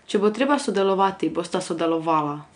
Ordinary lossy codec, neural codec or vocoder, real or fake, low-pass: none; none; real; 9.9 kHz